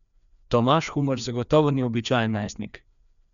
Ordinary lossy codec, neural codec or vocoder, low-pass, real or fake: none; codec, 16 kHz, 2 kbps, FreqCodec, larger model; 7.2 kHz; fake